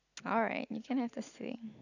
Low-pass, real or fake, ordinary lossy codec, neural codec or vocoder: 7.2 kHz; real; none; none